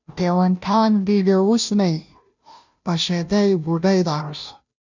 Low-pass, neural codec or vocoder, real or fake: 7.2 kHz; codec, 16 kHz, 0.5 kbps, FunCodec, trained on Chinese and English, 25 frames a second; fake